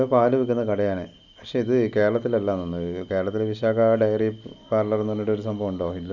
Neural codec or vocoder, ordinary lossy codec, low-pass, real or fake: none; none; 7.2 kHz; real